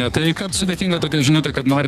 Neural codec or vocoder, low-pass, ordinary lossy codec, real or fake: codec, 32 kHz, 1.9 kbps, SNAC; 14.4 kHz; Opus, 64 kbps; fake